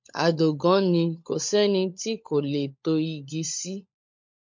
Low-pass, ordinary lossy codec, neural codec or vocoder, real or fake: 7.2 kHz; MP3, 48 kbps; codec, 16 kHz, 16 kbps, FunCodec, trained on LibriTTS, 50 frames a second; fake